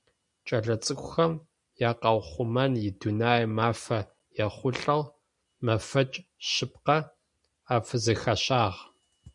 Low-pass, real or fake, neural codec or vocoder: 10.8 kHz; real; none